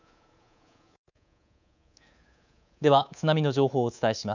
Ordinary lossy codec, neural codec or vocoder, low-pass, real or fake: none; codec, 24 kHz, 3.1 kbps, DualCodec; 7.2 kHz; fake